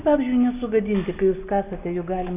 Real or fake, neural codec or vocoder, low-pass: real; none; 3.6 kHz